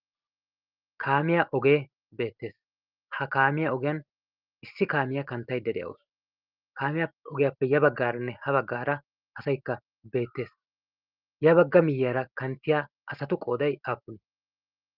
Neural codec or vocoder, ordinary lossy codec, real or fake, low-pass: none; Opus, 32 kbps; real; 5.4 kHz